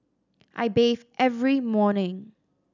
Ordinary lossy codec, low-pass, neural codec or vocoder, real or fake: none; 7.2 kHz; none; real